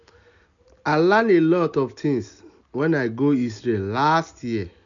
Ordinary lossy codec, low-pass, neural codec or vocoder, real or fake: none; 7.2 kHz; none; real